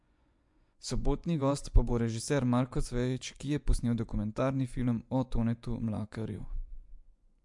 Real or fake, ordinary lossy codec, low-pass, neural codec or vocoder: fake; MP3, 64 kbps; 10.8 kHz; vocoder, 24 kHz, 100 mel bands, Vocos